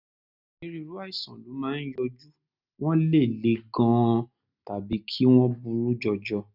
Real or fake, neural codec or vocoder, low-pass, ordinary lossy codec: real; none; 5.4 kHz; none